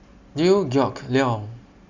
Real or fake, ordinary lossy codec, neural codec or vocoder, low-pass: real; Opus, 64 kbps; none; 7.2 kHz